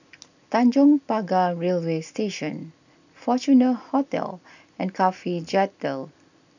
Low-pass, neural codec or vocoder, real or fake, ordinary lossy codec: 7.2 kHz; none; real; none